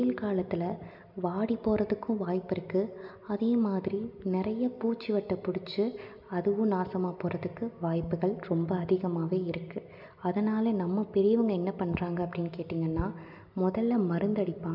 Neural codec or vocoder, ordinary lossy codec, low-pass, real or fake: none; none; 5.4 kHz; real